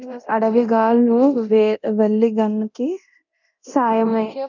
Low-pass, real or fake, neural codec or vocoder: 7.2 kHz; fake; codec, 24 kHz, 0.9 kbps, DualCodec